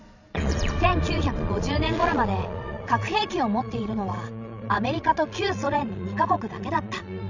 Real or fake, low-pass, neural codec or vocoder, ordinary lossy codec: fake; 7.2 kHz; vocoder, 22.05 kHz, 80 mel bands, Vocos; none